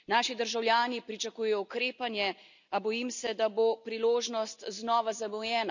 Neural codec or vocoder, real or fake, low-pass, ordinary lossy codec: none; real; 7.2 kHz; none